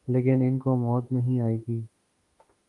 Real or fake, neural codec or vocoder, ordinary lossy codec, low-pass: fake; autoencoder, 48 kHz, 32 numbers a frame, DAC-VAE, trained on Japanese speech; Opus, 24 kbps; 10.8 kHz